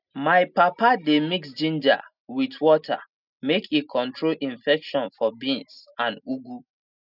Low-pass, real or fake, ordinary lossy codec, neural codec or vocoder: 5.4 kHz; real; none; none